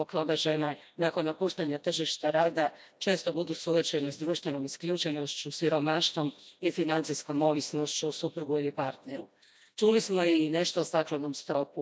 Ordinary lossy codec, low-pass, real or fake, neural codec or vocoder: none; none; fake; codec, 16 kHz, 1 kbps, FreqCodec, smaller model